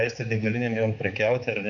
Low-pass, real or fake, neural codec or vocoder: 7.2 kHz; fake; codec, 16 kHz, 4 kbps, X-Codec, HuBERT features, trained on balanced general audio